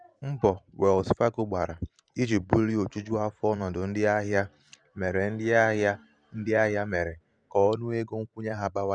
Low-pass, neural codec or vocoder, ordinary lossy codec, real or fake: 9.9 kHz; vocoder, 44.1 kHz, 128 mel bands every 512 samples, BigVGAN v2; none; fake